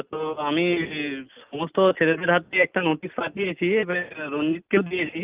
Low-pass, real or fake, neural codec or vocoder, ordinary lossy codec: 3.6 kHz; real; none; Opus, 32 kbps